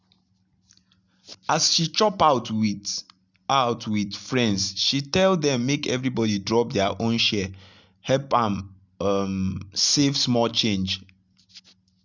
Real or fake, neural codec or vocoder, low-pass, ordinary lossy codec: real; none; 7.2 kHz; none